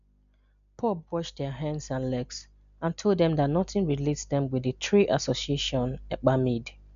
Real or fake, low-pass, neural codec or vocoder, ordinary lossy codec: real; 7.2 kHz; none; none